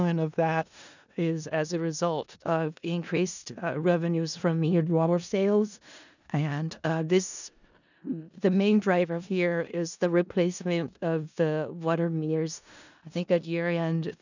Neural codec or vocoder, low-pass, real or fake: codec, 16 kHz in and 24 kHz out, 0.4 kbps, LongCat-Audio-Codec, four codebook decoder; 7.2 kHz; fake